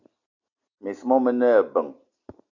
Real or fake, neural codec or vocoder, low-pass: real; none; 7.2 kHz